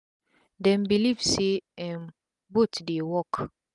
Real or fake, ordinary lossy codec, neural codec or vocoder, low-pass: real; none; none; 10.8 kHz